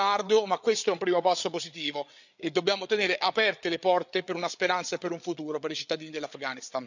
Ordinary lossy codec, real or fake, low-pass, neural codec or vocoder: none; fake; 7.2 kHz; codec, 16 kHz, 8 kbps, FreqCodec, larger model